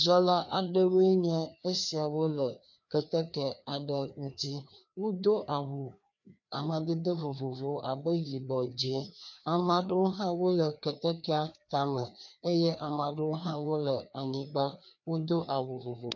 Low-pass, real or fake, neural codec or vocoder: 7.2 kHz; fake; codec, 16 kHz, 2 kbps, FreqCodec, larger model